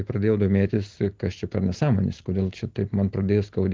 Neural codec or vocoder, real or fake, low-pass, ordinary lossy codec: none; real; 7.2 kHz; Opus, 16 kbps